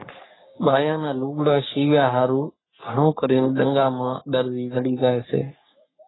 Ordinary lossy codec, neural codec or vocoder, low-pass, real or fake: AAC, 16 kbps; codec, 44.1 kHz, 3.4 kbps, Pupu-Codec; 7.2 kHz; fake